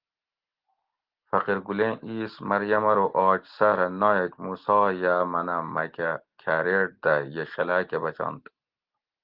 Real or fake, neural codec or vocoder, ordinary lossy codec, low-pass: real; none; Opus, 16 kbps; 5.4 kHz